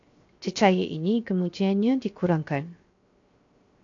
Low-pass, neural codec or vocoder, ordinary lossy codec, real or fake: 7.2 kHz; codec, 16 kHz, 0.7 kbps, FocalCodec; AAC, 48 kbps; fake